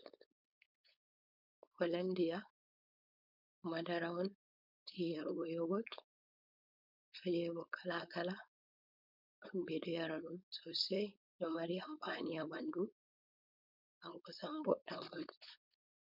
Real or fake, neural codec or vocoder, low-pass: fake; codec, 16 kHz, 4.8 kbps, FACodec; 5.4 kHz